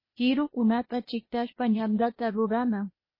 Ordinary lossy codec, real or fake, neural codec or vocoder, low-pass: MP3, 24 kbps; fake; codec, 16 kHz, 0.8 kbps, ZipCodec; 5.4 kHz